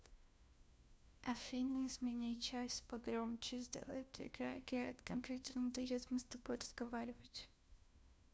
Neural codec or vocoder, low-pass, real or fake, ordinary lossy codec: codec, 16 kHz, 1 kbps, FunCodec, trained on LibriTTS, 50 frames a second; none; fake; none